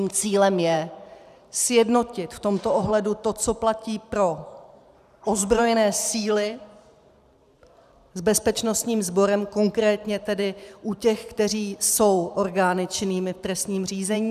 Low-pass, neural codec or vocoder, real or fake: 14.4 kHz; vocoder, 44.1 kHz, 128 mel bands every 512 samples, BigVGAN v2; fake